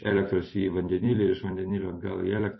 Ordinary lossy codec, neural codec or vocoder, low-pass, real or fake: MP3, 24 kbps; vocoder, 44.1 kHz, 128 mel bands every 256 samples, BigVGAN v2; 7.2 kHz; fake